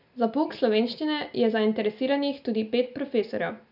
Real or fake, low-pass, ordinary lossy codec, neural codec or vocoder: real; 5.4 kHz; none; none